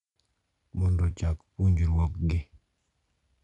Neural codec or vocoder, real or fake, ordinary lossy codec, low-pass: none; real; none; 10.8 kHz